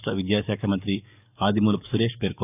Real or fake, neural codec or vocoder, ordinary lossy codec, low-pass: fake; codec, 44.1 kHz, 7.8 kbps, DAC; none; 3.6 kHz